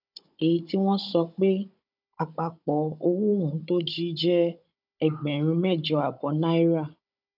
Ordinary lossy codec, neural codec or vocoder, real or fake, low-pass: none; codec, 16 kHz, 16 kbps, FunCodec, trained on Chinese and English, 50 frames a second; fake; 5.4 kHz